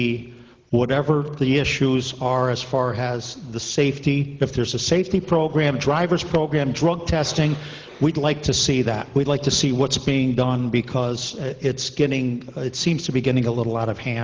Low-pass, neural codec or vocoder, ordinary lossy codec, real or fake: 7.2 kHz; none; Opus, 16 kbps; real